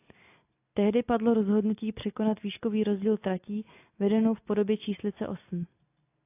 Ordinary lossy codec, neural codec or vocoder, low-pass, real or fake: AAC, 24 kbps; none; 3.6 kHz; real